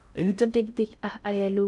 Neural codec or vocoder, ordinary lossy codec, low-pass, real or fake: codec, 16 kHz in and 24 kHz out, 0.6 kbps, FocalCodec, streaming, 4096 codes; none; 10.8 kHz; fake